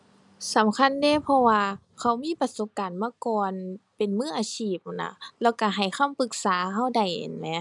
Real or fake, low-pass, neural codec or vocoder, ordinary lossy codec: real; 10.8 kHz; none; none